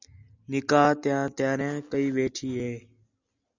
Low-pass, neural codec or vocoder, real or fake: 7.2 kHz; none; real